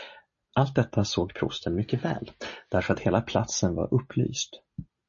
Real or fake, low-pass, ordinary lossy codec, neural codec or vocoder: real; 7.2 kHz; MP3, 32 kbps; none